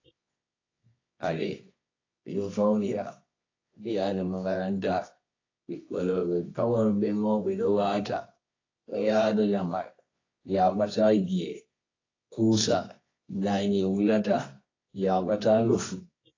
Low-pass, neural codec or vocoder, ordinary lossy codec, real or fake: 7.2 kHz; codec, 24 kHz, 0.9 kbps, WavTokenizer, medium music audio release; AAC, 32 kbps; fake